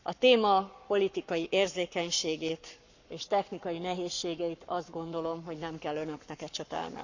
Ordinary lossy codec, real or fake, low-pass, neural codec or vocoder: none; fake; 7.2 kHz; codec, 44.1 kHz, 7.8 kbps, Pupu-Codec